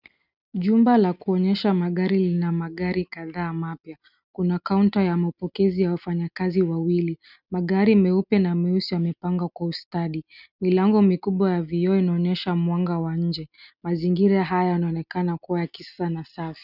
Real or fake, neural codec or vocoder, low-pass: real; none; 5.4 kHz